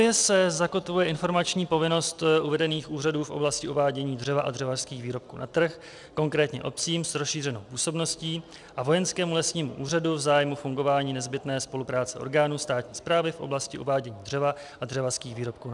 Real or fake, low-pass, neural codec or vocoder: real; 10.8 kHz; none